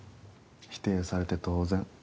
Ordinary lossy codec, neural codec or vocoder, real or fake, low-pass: none; none; real; none